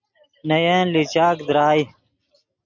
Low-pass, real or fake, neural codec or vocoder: 7.2 kHz; real; none